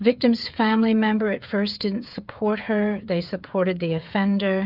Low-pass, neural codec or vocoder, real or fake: 5.4 kHz; codec, 16 kHz, 8 kbps, FreqCodec, smaller model; fake